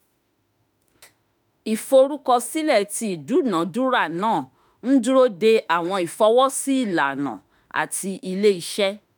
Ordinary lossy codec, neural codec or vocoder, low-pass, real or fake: none; autoencoder, 48 kHz, 32 numbers a frame, DAC-VAE, trained on Japanese speech; none; fake